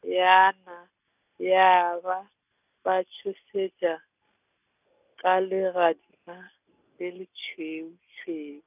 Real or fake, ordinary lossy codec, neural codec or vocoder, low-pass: real; none; none; 3.6 kHz